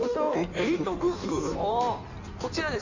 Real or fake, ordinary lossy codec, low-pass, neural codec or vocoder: fake; none; 7.2 kHz; codec, 16 kHz in and 24 kHz out, 1.1 kbps, FireRedTTS-2 codec